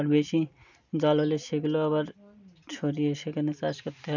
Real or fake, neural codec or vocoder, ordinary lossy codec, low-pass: real; none; none; 7.2 kHz